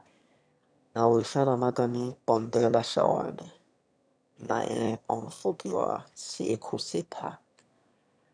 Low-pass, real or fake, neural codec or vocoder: 9.9 kHz; fake; autoencoder, 22.05 kHz, a latent of 192 numbers a frame, VITS, trained on one speaker